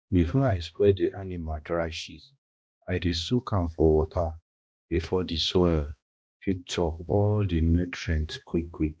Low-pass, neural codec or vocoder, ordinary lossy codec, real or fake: none; codec, 16 kHz, 1 kbps, X-Codec, HuBERT features, trained on balanced general audio; none; fake